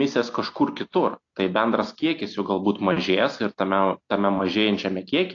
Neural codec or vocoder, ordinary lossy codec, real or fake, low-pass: none; AAC, 48 kbps; real; 7.2 kHz